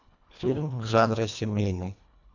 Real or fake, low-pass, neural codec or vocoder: fake; 7.2 kHz; codec, 24 kHz, 1.5 kbps, HILCodec